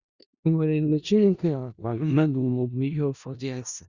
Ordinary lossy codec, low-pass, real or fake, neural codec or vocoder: Opus, 64 kbps; 7.2 kHz; fake; codec, 16 kHz in and 24 kHz out, 0.4 kbps, LongCat-Audio-Codec, four codebook decoder